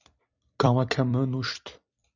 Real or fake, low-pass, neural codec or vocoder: fake; 7.2 kHz; vocoder, 44.1 kHz, 128 mel bands every 256 samples, BigVGAN v2